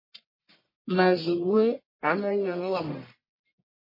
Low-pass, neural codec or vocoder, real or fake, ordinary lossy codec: 5.4 kHz; codec, 44.1 kHz, 1.7 kbps, Pupu-Codec; fake; MP3, 24 kbps